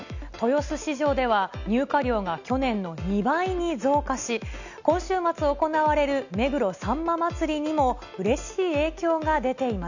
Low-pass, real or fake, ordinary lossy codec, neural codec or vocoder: 7.2 kHz; real; none; none